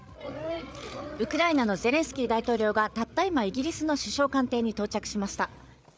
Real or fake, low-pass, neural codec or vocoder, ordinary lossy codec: fake; none; codec, 16 kHz, 8 kbps, FreqCodec, larger model; none